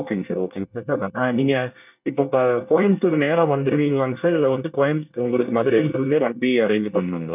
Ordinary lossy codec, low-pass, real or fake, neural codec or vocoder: none; 3.6 kHz; fake; codec, 24 kHz, 1 kbps, SNAC